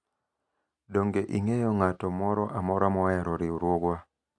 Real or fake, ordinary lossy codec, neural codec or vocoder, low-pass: real; none; none; none